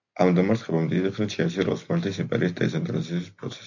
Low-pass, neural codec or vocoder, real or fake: 7.2 kHz; none; real